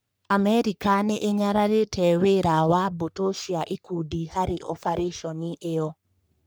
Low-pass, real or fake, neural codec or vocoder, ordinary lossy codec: none; fake; codec, 44.1 kHz, 3.4 kbps, Pupu-Codec; none